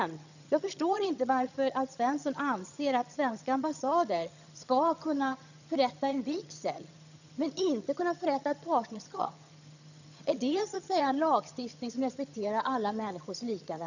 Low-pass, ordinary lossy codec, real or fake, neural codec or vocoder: 7.2 kHz; none; fake; vocoder, 22.05 kHz, 80 mel bands, HiFi-GAN